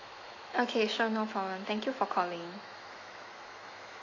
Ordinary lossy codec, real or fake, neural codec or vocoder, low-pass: AAC, 32 kbps; fake; autoencoder, 48 kHz, 128 numbers a frame, DAC-VAE, trained on Japanese speech; 7.2 kHz